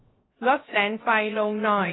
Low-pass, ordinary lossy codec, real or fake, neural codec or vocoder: 7.2 kHz; AAC, 16 kbps; fake; codec, 16 kHz, 0.3 kbps, FocalCodec